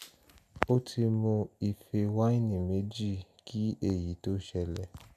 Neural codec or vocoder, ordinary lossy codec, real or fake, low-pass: none; none; real; 14.4 kHz